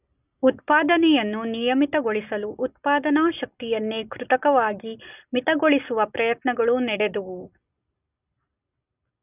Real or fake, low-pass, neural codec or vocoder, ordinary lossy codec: real; 3.6 kHz; none; none